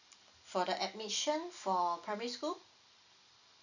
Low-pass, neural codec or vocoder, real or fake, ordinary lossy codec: 7.2 kHz; none; real; none